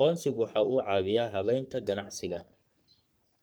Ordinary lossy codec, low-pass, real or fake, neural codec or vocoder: none; none; fake; codec, 44.1 kHz, 3.4 kbps, Pupu-Codec